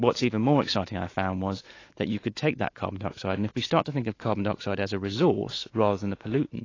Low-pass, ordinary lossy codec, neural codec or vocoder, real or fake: 7.2 kHz; AAC, 32 kbps; autoencoder, 48 kHz, 128 numbers a frame, DAC-VAE, trained on Japanese speech; fake